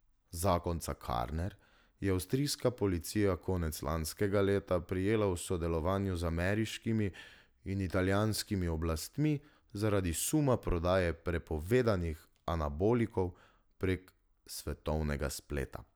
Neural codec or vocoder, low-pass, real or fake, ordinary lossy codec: none; none; real; none